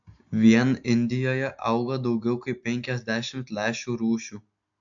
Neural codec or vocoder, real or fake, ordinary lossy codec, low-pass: none; real; AAC, 64 kbps; 7.2 kHz